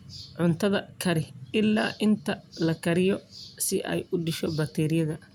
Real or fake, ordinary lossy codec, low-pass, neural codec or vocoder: real; none; 19.8 kHz; none